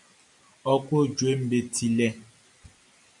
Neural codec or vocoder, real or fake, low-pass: none; real; 10.8 kHz